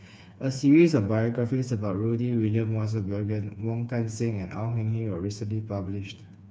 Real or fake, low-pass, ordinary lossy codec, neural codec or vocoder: fake; none; none; codec, 16 kHz, 4 kbps, FreqCodec, smaller model